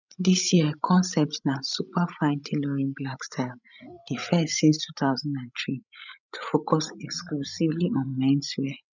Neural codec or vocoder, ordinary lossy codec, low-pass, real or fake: codec, 16 kHz, 16 kbps, FreqCodec, larger model; none; 7.2 kHz; fake